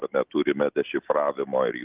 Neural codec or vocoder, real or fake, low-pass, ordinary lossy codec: none; real; 3.6 kHz; Opus, 32 kbps